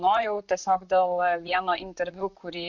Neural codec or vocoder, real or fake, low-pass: vocoder, 44.1 kHz, 128 mel bands, Pupu-Vocoder; fake; 7.2 kHz